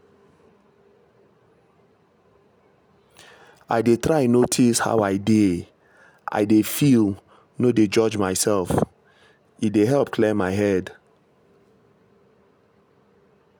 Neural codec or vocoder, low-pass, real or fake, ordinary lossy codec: none; none; real; none